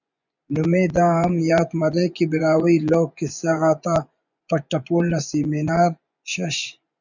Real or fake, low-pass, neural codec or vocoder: fake; 7.2 kHz; vocoder, 24 kHz, 100 mel bands, Vocos